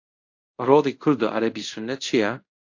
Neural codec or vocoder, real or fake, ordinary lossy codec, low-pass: codec, 24 kHz, 0.5 kbps, DualCodec; fake; AAC, 48 kbps; 7.2 kHz